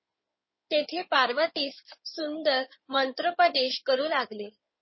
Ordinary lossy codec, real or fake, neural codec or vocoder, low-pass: MP3, 24 kbps; fake; codec, 16 kHz in and 24 kHz out, 2.2 kbps, FireRedTTS-2 codec; 7.2 kHz